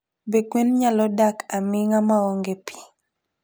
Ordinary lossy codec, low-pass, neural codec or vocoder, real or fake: none; none; none; real